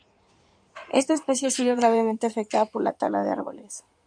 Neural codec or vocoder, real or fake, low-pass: codec, 16 kHz in and 24 kHz out, 2.2 kbps, FireRedTTS-2 codec; fake; 9.9 kHz